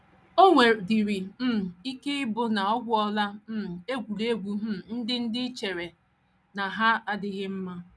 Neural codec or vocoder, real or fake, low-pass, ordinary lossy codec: none; real; none; none